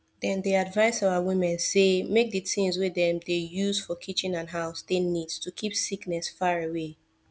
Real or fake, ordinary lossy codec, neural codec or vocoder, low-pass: real; none; none; none